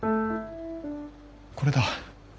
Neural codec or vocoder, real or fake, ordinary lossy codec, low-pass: none; real; none; none